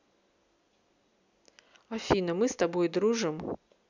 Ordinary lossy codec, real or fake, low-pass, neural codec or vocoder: none; real; 7.2 kHz; none